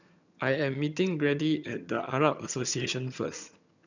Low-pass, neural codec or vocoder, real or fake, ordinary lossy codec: 7.2 kHz; vocoder, 22.05 kHz, 80 mel bands, HiFi-GAN; fake; none